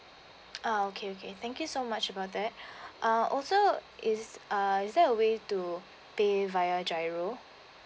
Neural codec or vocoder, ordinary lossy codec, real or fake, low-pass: none; none; real; none